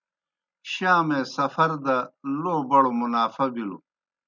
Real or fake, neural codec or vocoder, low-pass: real; none; 7.2 kHz